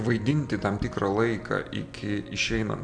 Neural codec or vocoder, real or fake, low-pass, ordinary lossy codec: none; real; 9.9 kHz; MP3, 64 kbps